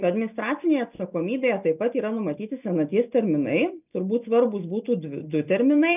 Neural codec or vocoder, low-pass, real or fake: none; 3.6 kHz; real